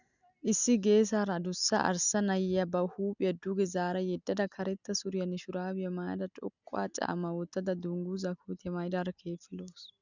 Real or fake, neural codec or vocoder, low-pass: real; none; 7.2 kHz